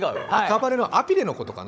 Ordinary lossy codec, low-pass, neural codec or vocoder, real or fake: none; none; codec, 16 kHz, 16 kbps, FunCodec, trained on Chinese and English, 50 frames a second; fake